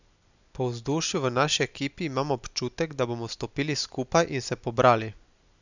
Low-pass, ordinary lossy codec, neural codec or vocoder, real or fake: 7.2 kHz; none; none; real